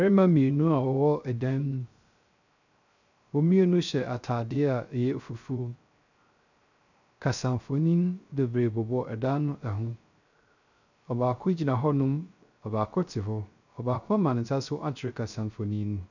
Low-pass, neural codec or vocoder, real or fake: 7.2 kHz; codec, 16 kHz, 0.3 kbps, FocalCodec; fake